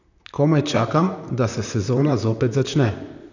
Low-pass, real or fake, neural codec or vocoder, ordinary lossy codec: 7.2 kHz; fake; vocoder, 44.1 kHz, 128 mel bands, Pupu-Vocoder; none